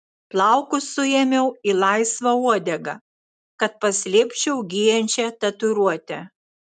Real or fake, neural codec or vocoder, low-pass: real; none; 9.9 kHz